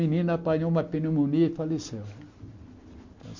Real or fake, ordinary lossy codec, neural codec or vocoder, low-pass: real; MP3, 48 kbps; none; 7.2 kHz